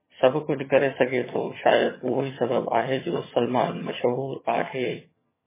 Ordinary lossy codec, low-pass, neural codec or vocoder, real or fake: MP3, 16 kbps; 3.6 kHz; vocoder, 22.05 kHz, 80 mel bands, HiFi-GAN; fake